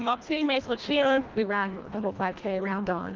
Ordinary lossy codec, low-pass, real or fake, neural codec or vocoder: Opus, 32 kbps; 7.2 kHz; fake; codec, 24 kHz, 1.5 kbps, HILCodec